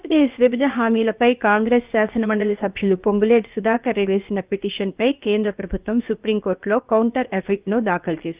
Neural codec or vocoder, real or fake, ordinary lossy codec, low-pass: codec, 16 kHz, about 1 kbps, DyCAST, with the encoder's durations; fake; Opus, 32 kbps; 3.6 kHz